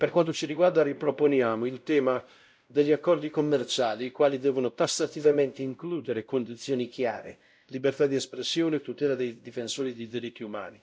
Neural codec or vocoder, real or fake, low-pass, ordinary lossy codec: codec, 16 kHz, 0.5 kbps, X-Codec, WavLM features, trained on Multilingual LibriSpeech; fake; none; none